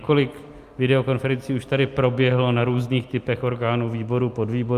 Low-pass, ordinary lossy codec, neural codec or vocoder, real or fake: 14.4 kHz; Opus, 32 kbps; none; real